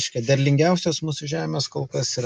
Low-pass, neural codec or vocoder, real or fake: 10.8 kHz; none; real